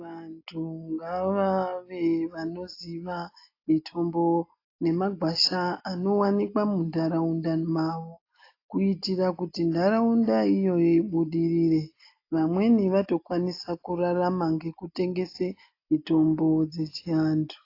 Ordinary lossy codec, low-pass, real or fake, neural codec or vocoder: AAC, 32 kbps; 5.4 kHz; real; none